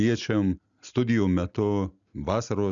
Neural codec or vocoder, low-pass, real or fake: none; 7.2 kHz; real